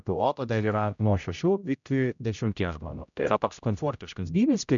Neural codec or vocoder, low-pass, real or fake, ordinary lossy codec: codec, 16 kHz, 0.5 kbps, X-Codec, HuBERT features, trained on general audio; 7.2 kHz; fake; MP3, 96 kbps